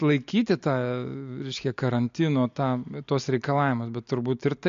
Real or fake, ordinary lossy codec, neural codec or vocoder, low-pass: real; MP3, 48 kbps; none; 7.2 kHz